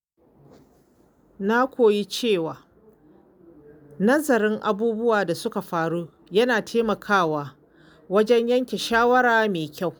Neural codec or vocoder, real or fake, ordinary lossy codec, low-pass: none; real; none; none